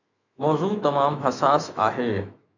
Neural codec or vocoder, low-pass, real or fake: autoencoder, 48 kHz, 128 numbers a frame, DAC-VAE, trained on Japanese speech; 7.2 kHz; fake